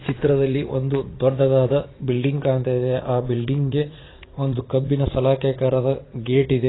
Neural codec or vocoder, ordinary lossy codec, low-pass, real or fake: vocoder, 44.1 kHz, 80 mel bands, Vocos; AAC, 16 kbps; 7.2 kHz; fake